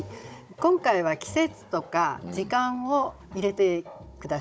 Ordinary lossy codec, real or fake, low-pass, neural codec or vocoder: none; fake; none; codec, 16 kHz, 16 kbps, FunCodec, trained on Chinese and English, 50 frames a second